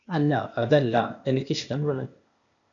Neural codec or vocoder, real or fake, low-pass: codec, 16 kHz, 0.8 kbps, ZipCodec; fake; 7.2 kHz